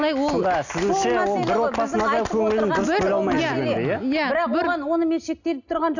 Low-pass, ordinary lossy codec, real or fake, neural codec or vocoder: 7.2 kHz; none; real; none